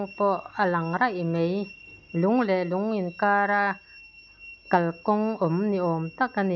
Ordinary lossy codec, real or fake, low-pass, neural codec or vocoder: none; real; 7.2 kHz; none